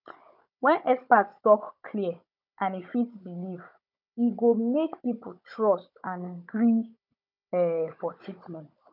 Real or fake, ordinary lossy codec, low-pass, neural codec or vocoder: fake; none; 5.4 kHz; codec, 16 kHz, 16 kbps, FunCodec, trained on Chinese and English, 50 frames a second